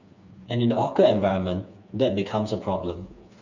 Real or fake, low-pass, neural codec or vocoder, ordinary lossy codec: fake; 7.2 kHz; codec, 16 kHz, 4 kbps, FreqCodec, smaller model; none